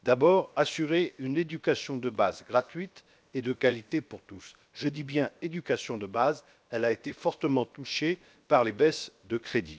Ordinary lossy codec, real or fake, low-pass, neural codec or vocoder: none; fake; none; codec, 16 kHz, 0.7 kbps, FocalCodec